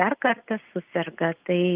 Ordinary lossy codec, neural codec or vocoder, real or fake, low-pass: Opus, 32 kbps; none; real; 3.6 kHz